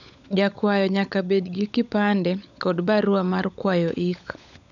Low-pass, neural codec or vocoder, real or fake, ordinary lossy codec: 7.2 kHz; codec, 16 kHz, 16 kbps, FunCodec, trained on LibriTTS, 50 frames a second; fake; none